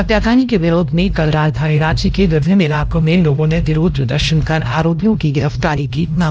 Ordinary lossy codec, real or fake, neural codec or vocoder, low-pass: none; fake; codec, 16 kHz, 1 kbps, X-Codec, HuBERT features, trained on LibriSpeech; none